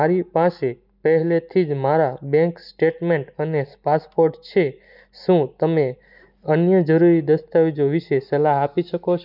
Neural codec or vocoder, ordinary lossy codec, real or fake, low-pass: none; none; real; 5.4 kHz